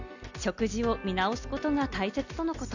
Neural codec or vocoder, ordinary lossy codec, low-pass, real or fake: none; Opus, 64 kbps; 7.2 kHz; real